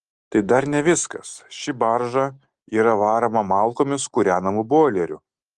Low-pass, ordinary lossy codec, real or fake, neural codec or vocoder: 10.8 kHz; Opus, 24 kbps; real; none